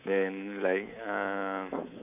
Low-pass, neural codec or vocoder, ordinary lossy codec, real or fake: 3.6 kHz; codec, 24 kHz, 3.1 kbps, DualCodec; none; fake